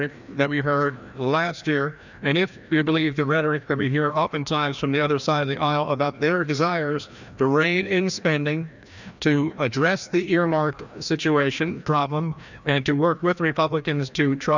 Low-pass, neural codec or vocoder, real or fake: 7.2 kHz; codec, 16 kHz, 1 kbps, FreqCodec, larger model; fake